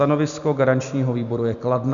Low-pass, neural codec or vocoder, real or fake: 7.2 kHz; none; real